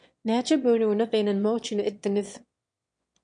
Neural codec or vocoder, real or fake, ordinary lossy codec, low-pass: autoencoder, 22.05 kHz, a latent of 192 numbers a frame, VITS, trained on one speaker; fake; MP3, 48 kbps; 9.9 kHz